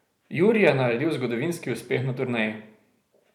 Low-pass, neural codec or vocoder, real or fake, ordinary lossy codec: 19.8 kHz; vocoder, 44.1 kHz, 128 mel bands every 512 samples, BigVGAN v2; fake; none